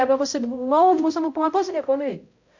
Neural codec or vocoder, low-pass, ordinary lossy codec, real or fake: codec, 16 kHz, 0.5 kbps, X-Codec, HuBERT features, trained on balanced general audio; 7.2 kHz; MP3, 48 kbps; fake